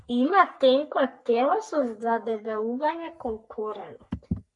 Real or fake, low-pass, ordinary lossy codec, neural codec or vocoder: fake; 10.8 kHz; MP3, 64 kbps; codec, 44.1 kHz, 3.4 kbps, Pupu-Codec